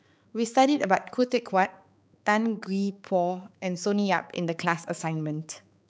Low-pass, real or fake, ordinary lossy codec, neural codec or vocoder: none; fake; none; codec, 16 kHz, 4 kbps, X-Codec, HuBERT features, trained on balanced general audio